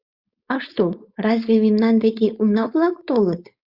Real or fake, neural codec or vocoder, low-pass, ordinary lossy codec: fake; codec, 16 kHz, 4.8 kbps, FACodec; 5.4 kHz; Opus, 64 kbps